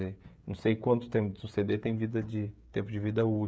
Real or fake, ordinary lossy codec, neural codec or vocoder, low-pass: fake; none; codec, 16 kHz, 16 kbps, FreqCodec, smaller model; none